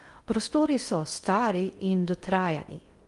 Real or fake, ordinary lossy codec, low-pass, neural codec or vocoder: fake; Opus, 32 kbps; 10.8 kHz; codec, 16 kHz in and 24 kHz out, 0.6 kbps, FocalCodec, streaming, 2048 codes